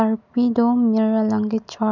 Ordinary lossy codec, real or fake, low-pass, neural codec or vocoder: none; real; 7.2 kHz; none